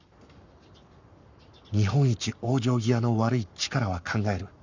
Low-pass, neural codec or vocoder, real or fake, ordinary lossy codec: 7.2 kHz; codec, 44.1 kHz, 7.8 kbps, Pupu-Codec; fake; none